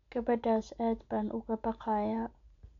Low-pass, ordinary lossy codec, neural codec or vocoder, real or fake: 7.2 kHz; none; none; real